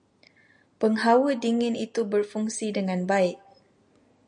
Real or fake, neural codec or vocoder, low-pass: real; none; 9.9 kHz